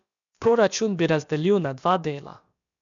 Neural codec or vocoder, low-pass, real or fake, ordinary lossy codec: codec, 16 kHz, about 1 kbps, DyCAST, with the encoder's durations; 7.2 kHz; fake; none